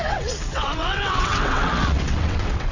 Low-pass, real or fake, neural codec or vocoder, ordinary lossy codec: 7.2 kHz; fake; vocoder, 22.05 kHz, 80 mel bands, WaveNeXt; none